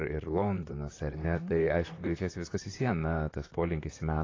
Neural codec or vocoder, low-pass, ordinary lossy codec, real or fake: vocoder, 44.1 kHz, 128 mel bands, Pupu-Vocoder; 7.2 kHz; AAC, 32 kbps; fake